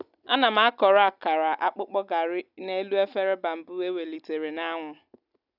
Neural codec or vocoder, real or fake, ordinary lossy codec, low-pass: none; real; none; 5.4 kHz